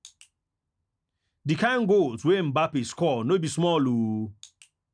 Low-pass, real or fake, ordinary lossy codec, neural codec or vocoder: 9.9 kHz; real; none; none